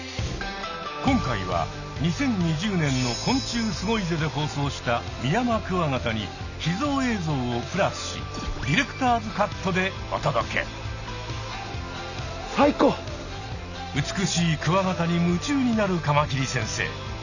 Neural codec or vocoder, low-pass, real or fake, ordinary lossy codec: none; 7.2 kHz; real; none